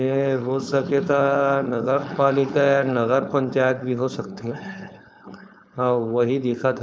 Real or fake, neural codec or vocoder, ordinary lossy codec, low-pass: fake; codec, 16 kHz, 4.8 kbps, FACodec; none; none